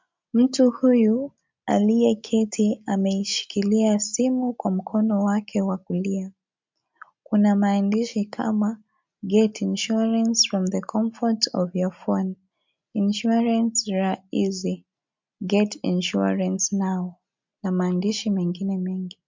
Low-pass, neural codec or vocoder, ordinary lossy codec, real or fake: 7.2 kHz; none; MP3, 64 kbps; real